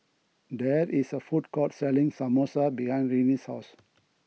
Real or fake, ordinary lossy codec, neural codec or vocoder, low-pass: real; none; none; none